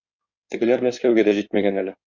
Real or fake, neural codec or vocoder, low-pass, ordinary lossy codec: fake; codec, 16 kHz in and 24 kHz out, 2.2 kbps, FireRedTTS-2 codec; 7.2 kHz; Opus, 64 kbps